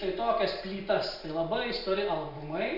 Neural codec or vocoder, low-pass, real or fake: none; 5.4 kHz; real